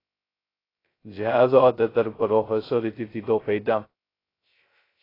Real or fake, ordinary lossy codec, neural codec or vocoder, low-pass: fake; AAC, 24 kbps; codec, 16 kHz, 0.2 kbps, FocalCodec; 5.4 kHz